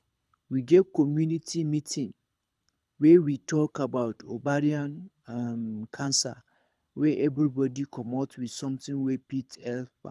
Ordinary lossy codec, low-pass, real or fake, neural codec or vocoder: none; none; fake; codec, 24 kHz, 6 kbps, HILCodec